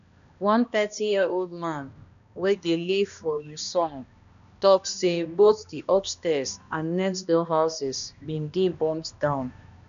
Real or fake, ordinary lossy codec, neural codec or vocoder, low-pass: fake; none; codec, 16 kHz, 1 kbps, X-Codec, HuBERT features, trained on balanced general audio; 7.2 kHz